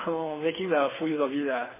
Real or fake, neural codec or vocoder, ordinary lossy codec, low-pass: fake; codec, 24 kHz, 6 kbps, HILCodec; MP3, 16 kbps; 3.6 kHz